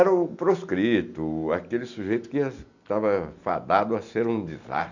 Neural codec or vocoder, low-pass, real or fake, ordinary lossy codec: none; 7.2 kHz; real; none